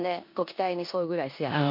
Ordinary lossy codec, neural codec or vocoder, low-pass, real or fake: MP3, 32 kbps; codec, 16 kHz in and 24 kHz out, 0.9 kbps, LongCat-Audio-Codec, four codebook decoder; 5.4 kHz; fake